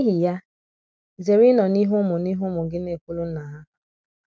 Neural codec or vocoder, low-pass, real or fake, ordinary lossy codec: none; none; real; none